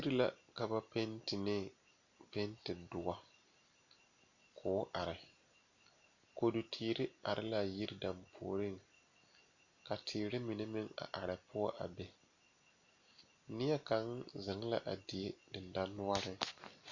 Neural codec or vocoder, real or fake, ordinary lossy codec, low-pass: none; real; Opus, 64 kbps; 7.2 kHz